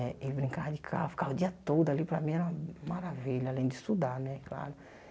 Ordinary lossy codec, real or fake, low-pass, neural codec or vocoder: none; real; none; none